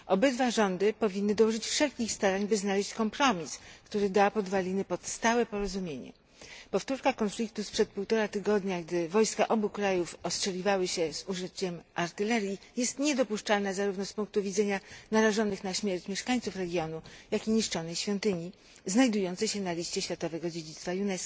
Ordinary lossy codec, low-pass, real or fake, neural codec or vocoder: none; none; real; none